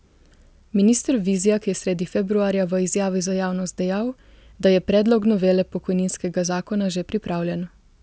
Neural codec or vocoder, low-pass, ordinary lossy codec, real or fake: none; none; none; real